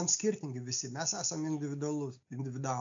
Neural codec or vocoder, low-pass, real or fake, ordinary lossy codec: none; 7.2 kHz; real; AAC, 64 kbps